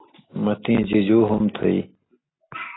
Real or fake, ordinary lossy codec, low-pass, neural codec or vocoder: real; AAC, 16 kbps; 7.2 kHz; none